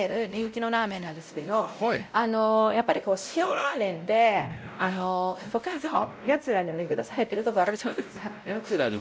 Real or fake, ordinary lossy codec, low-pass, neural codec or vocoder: fake; none; none; codec, 16 kHz, 0.5 kbps, X-Codec, WavLM features, trained on Multilingual LibriSpeech